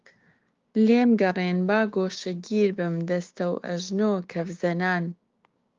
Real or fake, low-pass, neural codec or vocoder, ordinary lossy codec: fake; 7.2 kHz; codec, 16 kHz, 6 kbps, DAC; Opus, 32 kbps